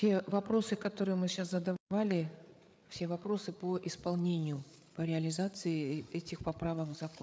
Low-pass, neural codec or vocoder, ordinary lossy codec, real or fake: none; codec, 16 kHz, 8 kbps, FreqCodec, larger model; none; fake